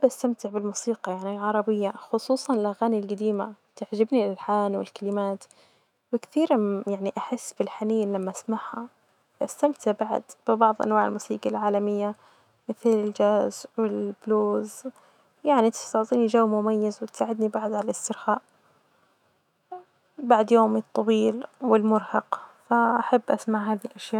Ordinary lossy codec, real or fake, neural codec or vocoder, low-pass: none; fake; autoencoder, 48 kHz, 128 numbers a frame, DAC-VAE, trained on Japanese speech; 19.8 kHz